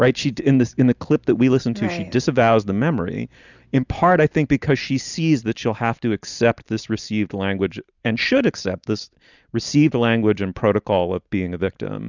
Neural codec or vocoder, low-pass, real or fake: none; 7.2 kHz; real